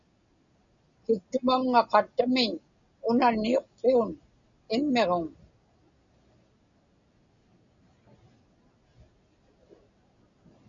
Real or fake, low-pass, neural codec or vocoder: real; 7.2 kHz; none